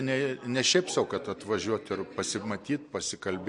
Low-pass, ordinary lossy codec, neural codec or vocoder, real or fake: 10.8 kHz; MP3, 48 kbps; none; real